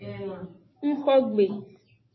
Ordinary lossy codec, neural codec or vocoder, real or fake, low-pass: MP3, 24 kbps; none; real; 7.2 kHz